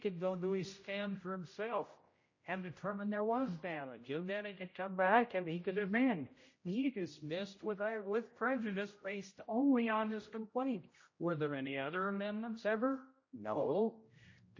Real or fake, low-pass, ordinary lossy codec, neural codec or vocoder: fake; 7.2 kHz; MP3, 32 kbps; codec, 16 kHz, 0.5 kbps, X-Codec, HuBERT features, trained on general audio